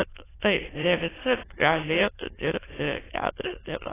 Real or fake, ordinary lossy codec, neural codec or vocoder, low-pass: fake; AAC, 16 kbps; autoencoder, 22.05 kHz, a latent of 192 numbers a frame, VITS, trained on many speakers; 3.6 kHz